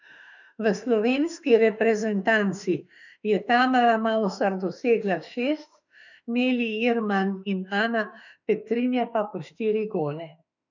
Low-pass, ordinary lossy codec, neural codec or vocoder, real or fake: 7.2 kHz; none; autoencoder, 48 kHz, 32 numbers a frame, DAC-VAE, trained on Japanese speech; fake